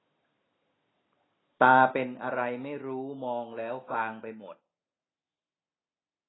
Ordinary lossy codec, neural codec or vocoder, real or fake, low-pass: AAC, 16 kbps; none; real; 7.2 kHz